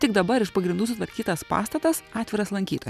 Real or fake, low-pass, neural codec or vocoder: real; 14.4 kHz; none